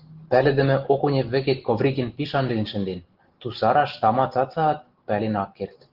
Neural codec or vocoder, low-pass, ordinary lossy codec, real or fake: none; 5.4 kHz; Opus, 16 kbps; real